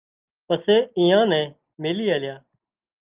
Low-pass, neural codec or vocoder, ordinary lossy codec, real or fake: 3.6 kHz; none; Opus, 24 kbps; real